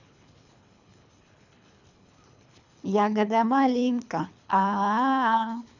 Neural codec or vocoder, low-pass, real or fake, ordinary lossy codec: codec, 24 kHz, 3 kbps, HILCodec; 7.2 kHz; fake; none